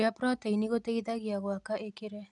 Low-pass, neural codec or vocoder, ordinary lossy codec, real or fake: 10.8 kHz; none; none; real